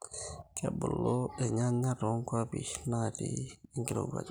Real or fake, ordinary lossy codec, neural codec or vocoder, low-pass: real; none; none; none